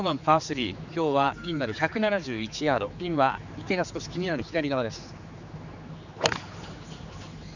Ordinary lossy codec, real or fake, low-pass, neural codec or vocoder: none; fake; 7.2 kHz; codec, 16 kHz, 4 kbps, X-Codec, HuBERT features, trained on general audio